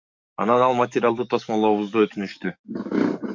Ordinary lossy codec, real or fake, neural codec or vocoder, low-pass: MP3, 48 kbps; fake; codec, 44.1 kHz, 7.8 kbps, DAC; 7.2 kHz